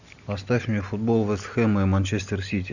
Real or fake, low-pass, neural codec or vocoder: real; 7.2 kHz; none